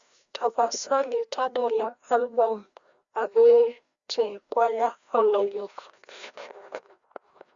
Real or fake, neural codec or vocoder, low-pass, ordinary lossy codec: fake; codec, 16 kHz, 1 kbps, FreqCodec, larger model; 7.2 kHz; Opus, 64 kbps